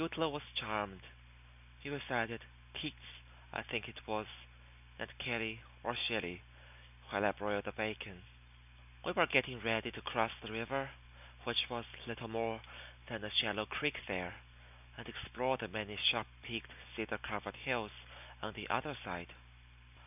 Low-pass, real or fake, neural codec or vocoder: 3.6 kHz; real; none